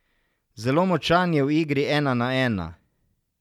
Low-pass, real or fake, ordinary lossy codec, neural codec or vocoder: 19.8 kHz; real; none; none